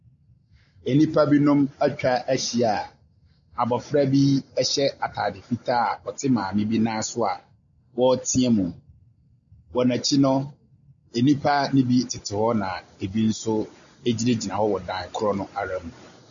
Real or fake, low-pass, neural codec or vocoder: real; 7.2 kHz; none